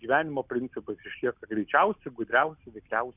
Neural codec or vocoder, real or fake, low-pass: none; real; 3.6 kHz